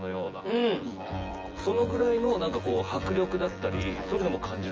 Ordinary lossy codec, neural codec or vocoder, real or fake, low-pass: Opus, 24 kbps; vocoder, 24 kHz, 100 mel bands, Vocos; fake; 7.2 kHz